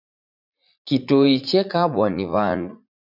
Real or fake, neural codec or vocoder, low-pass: fake; vocoder, 44.1 kHz, 80 mel bands, Vocos; 5.4 kHz